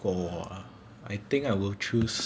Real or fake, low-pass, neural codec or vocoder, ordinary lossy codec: real; none; none; none